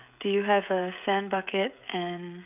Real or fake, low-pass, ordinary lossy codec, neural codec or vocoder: fake; 3.6 kHz; none; codec, 16 kHz, 16 kbps, FreqCodec, smaller model